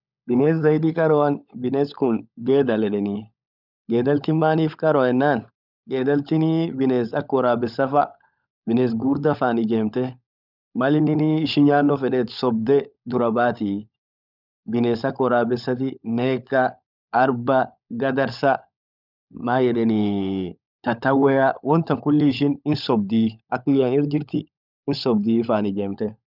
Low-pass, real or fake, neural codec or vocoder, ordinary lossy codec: 5.4 kHz; fake; codec, 16 kHz, 16 kbps, FunCodec, trained on LibriTTS, 50 frames a second; none